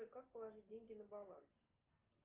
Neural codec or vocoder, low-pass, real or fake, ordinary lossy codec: none; 3.6 kHz; real; Opus, 32 kbps